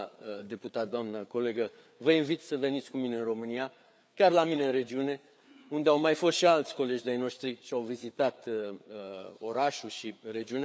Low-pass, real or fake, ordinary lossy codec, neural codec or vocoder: none; fake; none; codec, 16 kHz, 4 kbps, FreqCodec, larger model